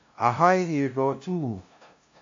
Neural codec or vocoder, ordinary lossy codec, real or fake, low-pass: codec, 16 kHz, 0.5 kbps, FunCodec, trained on LibriTTS, 25 frames a second; AAC, 48 kbps; fake; 7.2 kHz